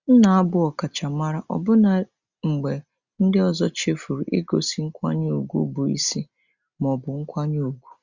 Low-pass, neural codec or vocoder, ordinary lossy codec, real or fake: 7.2 kHz; none; Opus, 64 kbps; real